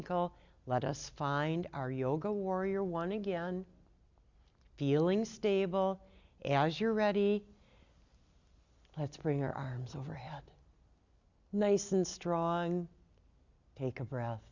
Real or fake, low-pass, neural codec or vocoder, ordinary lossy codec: real; 7.2 kHz; none; Opus, 64 kbps